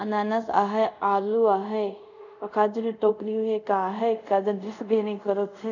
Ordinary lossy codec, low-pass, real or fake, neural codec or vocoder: none; 7.2 kHz; fake; codec, 24 kHz, 0.5 kbps, DualCodec